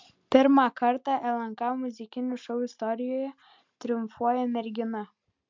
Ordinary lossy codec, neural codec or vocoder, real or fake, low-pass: MP3, 64 kbps; none; real; 7.2 kHz